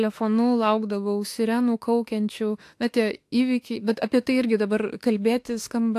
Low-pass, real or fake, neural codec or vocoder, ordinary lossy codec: 14.4 kHz; fake; autoencoder, 48 kHz, 32 numbers a frame, DAC-VAE, trained on Japanese speech; AAC, 64 kbps